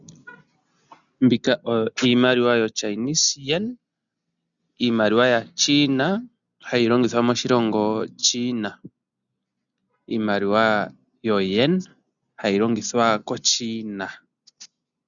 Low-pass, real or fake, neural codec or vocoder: 7.2 kHz; real; none